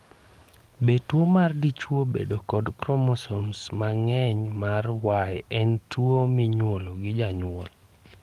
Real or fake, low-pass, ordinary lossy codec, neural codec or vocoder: fake; 14.4 kHz; Opus, 32 kbps; codec, 44.1 kHz, 7.8 kbps, DAC